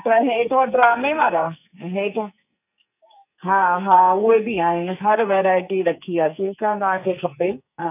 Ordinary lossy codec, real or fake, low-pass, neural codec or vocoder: none; fake; 3.6 kHz; codec, 44.1 kHz, 2.6 kbps, SNAC